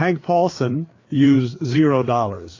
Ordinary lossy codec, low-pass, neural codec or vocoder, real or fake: AAC, 32 kbps; 7.2 kHz; codec, 16 kHz, 4 kbps, FreqCodec, larger model; fake